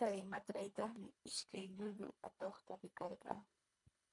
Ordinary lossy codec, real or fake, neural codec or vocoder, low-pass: MP3, 96 kbps; fake; codec, 24 kHz, 1.5 kbps, HILCodec; 10.8 kHz